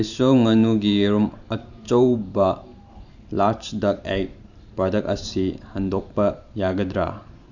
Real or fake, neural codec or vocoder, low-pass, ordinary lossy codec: fake; vocoder, 44.1 kHz, 128 mel bands every 512 samples, BigVGAN v2; 7.2 kHz; none